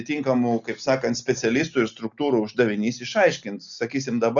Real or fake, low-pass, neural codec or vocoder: real; 7.2 kHz; none